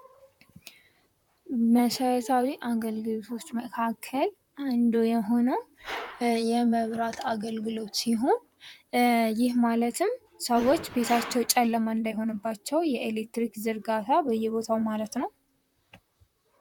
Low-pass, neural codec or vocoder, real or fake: 19.8 kHz; vocoder, 44.1 kHz, 128 mel bands, Pupu-Vocoder; fake